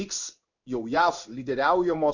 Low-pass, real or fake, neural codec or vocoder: 7.2 kHz; fake; codec, 16 kHz in and 24 kHz out, 1 kbps, XY-Tokenizer